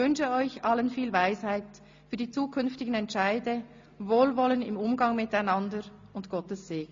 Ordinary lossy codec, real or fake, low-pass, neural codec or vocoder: MP3, 48 kbps; real; 7.2 kHz; none